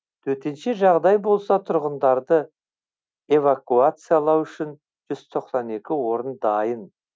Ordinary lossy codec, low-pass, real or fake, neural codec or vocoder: none; none; real; none